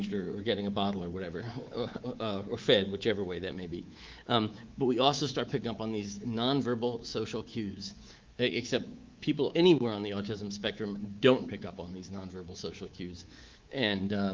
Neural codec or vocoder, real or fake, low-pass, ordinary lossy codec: codec, 24 kHz, 3.1 kbps, DualCodec; fake; 7.2 kHz; Opus, 32 kbps